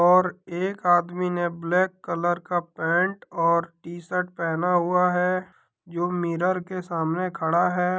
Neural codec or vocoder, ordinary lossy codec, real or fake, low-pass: none; none; real; none